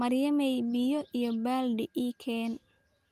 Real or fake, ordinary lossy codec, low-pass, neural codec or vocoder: real; Opus, 32 kbps; 14.4 kHz; none